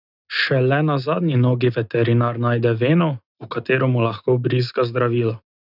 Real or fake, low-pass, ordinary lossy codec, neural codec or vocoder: real; 5.4 kHz; none; none